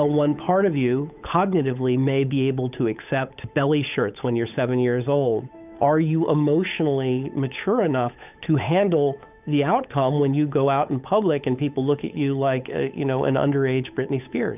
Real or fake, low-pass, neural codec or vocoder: fake; 3.6 kHz; codec, 16 kHz, 8 kbps, FunCodec, trained on Chinese and English, 25 frames a second